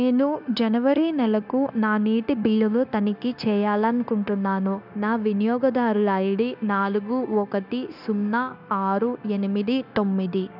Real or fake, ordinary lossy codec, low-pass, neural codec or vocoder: fake; none; 5.4 kHz; codec, 16 kHz, 0.9 kbps, LongCat-Audio-Codec